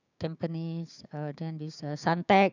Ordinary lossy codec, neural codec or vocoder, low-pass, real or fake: none; codec, 16 kHz, 6 kbps, DAC; 7.2 kHz; fake